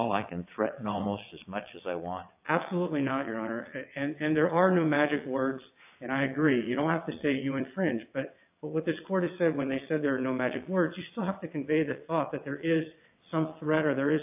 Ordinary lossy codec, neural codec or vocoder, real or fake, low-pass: AAC, 32 kbps; vocoder, 22.05 kHz, 80 mel bands, WaveNeXt; fake; 3.6 kHz